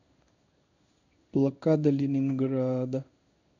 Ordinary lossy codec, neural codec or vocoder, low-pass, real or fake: none; codec, 16 kHz in and 24 kHz out, 1 kbps, XY-Tokenizer; 7.2 kHz; fake